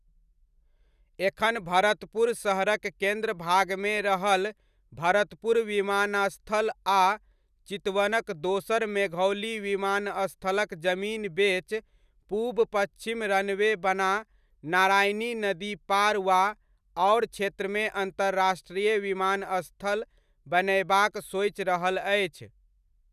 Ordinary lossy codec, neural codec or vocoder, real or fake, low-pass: none; none; real; 14.4 kHz